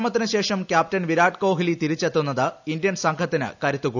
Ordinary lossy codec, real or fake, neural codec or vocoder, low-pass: none; real; none; 7.2 kHz